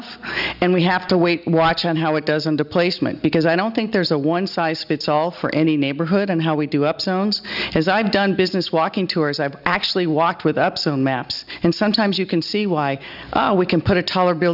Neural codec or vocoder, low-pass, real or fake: none; 5.4 kHz; real